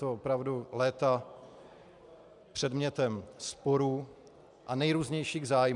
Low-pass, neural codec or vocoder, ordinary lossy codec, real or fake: 10.8 kHz; none; MP3, 96 kbps; real